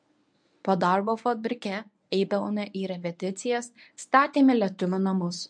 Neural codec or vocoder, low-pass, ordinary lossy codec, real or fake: codec, 24 kHz, 0.9 kbps, WavTokenizer, medium speech release version 1; 9.9 kHz; MP3, 64 kbps; fake